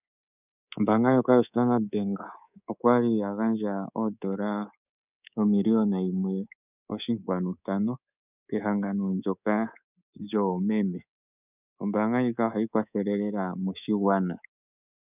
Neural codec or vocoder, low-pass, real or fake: codec, 24 kHz, 3.1 kbps, DualCodec; 3.6 kHz; fake